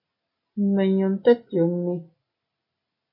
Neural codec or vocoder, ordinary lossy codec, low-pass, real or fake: none; MP3, 32 kbps; 5.4 kHz; real